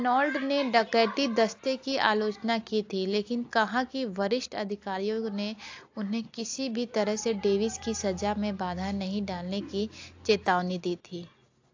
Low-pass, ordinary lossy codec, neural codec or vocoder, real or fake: 7.2 kHz; AAC, 48 kbps; none; real